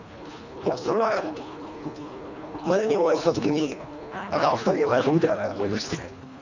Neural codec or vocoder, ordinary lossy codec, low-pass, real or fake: codec, 24 kHz, 1.5 kbps, HILCodec; none; 7.2 kHz; fake